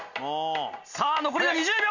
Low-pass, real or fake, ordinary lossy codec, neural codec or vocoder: 7.2 kHz; real; none; none